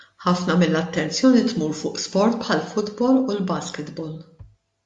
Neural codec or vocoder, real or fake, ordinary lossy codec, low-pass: none; real; AAC, 64 kbps; 10.8 kHz